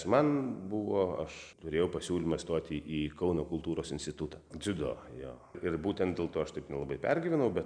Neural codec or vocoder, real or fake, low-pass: none; real; 9.9 kHz